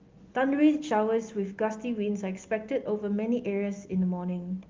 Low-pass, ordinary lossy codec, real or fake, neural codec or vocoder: 7.2 kHz; Opus, 32 kbps; real; none